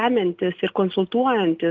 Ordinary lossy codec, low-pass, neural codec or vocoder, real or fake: Opus, 32 kbps; 7.2 kHz; none; real